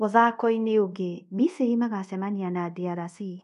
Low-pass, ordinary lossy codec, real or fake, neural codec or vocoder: 10.8 kHz; none; fake; codec, 24 kHz, 0.5 kbps, DualCodec